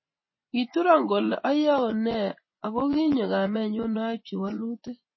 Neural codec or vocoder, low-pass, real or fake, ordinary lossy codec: vocoder, 24 kHz, 100 mel bands, Vocos; 7.2 kHz; fake; MP3, 24 kbps